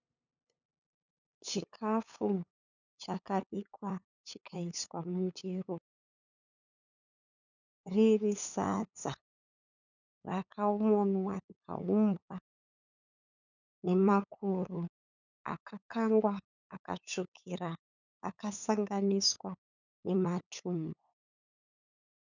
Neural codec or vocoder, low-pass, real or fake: codec, 16 kHz, 8 kbps, FunCodec, trained on LibriTTS, 25 frames a second; 7.2 kHz; fake